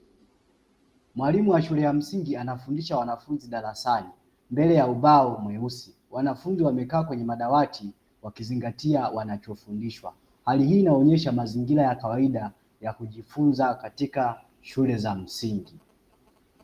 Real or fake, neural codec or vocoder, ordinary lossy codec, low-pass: real; none; Opus, 24 kbps; 14.4 kHz